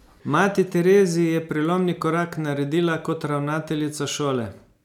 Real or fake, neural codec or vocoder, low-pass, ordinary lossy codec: real; none; 19.8 kHz; none